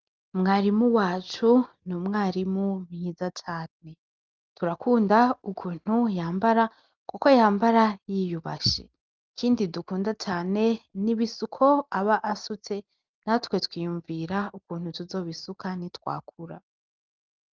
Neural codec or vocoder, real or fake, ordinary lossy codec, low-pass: none; real; Opus, 32 kbps; 7.2 kHz